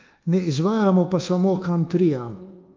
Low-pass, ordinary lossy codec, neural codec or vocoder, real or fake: 7.2 kHz; Opus, 24 kbps; codec, 24 kHz, 1.2 kbps, DualCodec; fake